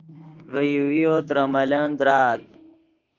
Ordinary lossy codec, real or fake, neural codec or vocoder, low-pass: Opus, 32 kbps; fake; autoencoder, 48 kHz, 32 numbers a frame, DAC-VAE, trained on Japanese speech; 7.2 kHz